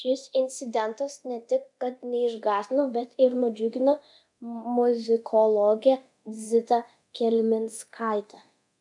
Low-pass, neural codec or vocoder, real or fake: 10.8 kHz; codec, 24 kHz, 0.9 kbps, DualCodec; fake